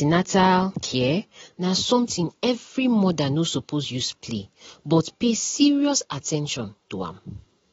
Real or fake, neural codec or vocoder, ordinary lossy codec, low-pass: real; none; AAC, 24 kbps; 7.2 kHz